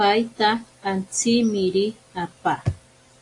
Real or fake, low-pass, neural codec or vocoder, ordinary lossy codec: real; 10.8 kHz; none; AAC, 48 kbps